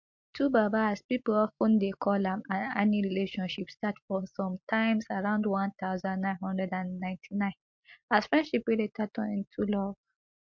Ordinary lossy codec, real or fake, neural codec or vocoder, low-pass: MP3, 48 kbps; real; none; 7.2 kHz